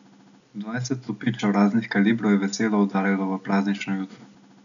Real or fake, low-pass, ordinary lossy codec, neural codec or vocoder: real; 7.2 kHz; none; none